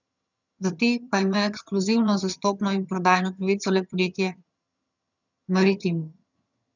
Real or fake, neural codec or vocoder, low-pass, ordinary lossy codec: fake; vocoder, 22.05 kHz, 80 mel bands, HiFi-GAN; 7.2 kHz; none